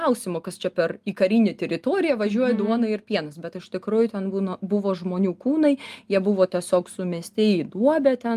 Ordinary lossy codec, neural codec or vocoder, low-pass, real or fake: Opus, 32 kbps; none; 14.4 kHz; real